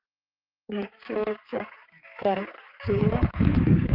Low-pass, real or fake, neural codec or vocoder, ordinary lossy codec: 5.4 kHz; fake; codec, 16 kHz, 4 kbps, X-Codec, HuBERT features, trained on balanced general audio; Opus, 32 kbps